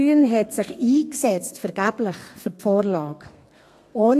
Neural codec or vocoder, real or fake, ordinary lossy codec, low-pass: codec, 32 kHz, 1.9 kbps, SNAC; fake; AAC, 64 kbps; 14.4 kHz